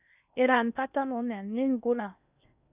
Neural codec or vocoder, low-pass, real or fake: codec, 16 kHz in and 24 kHz out, 0.8 kbps, FocalCodec, streaming, 65536 codes; 3.6 kHz; fake